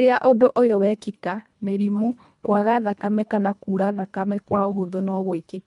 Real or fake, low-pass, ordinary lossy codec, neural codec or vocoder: fake; 10.8 kHz; MP3, 64 kbps; codec, 24 kHz, 1.5 kbps, HILCodec